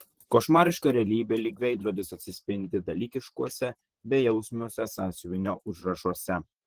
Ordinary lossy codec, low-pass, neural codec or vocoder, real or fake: Opus, 16 kbps; 14.4 kHz; vocoder, 44.1 kHz, 128 mel bands, Pupu-Vocoder; fake